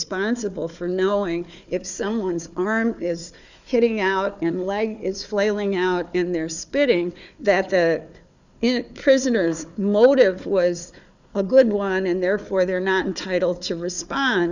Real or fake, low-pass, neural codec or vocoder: fake; 7.2 kHz; codec, 16 kHz, 4 kbps, FunCodec, trained on Chinese and English, 50 frames a second